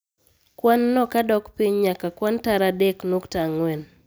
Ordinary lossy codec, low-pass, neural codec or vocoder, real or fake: none; none; none; real